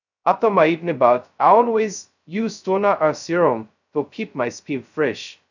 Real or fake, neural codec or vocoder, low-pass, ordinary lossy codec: fake; codec, 16 kHz, 0.2 kbps, FocalCodec; 7.2 kHz; none